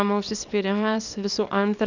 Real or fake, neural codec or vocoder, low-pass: fake; codec, 24 kHz, 0.9 kbps, WavTokenizer, small release; 7.2 kHz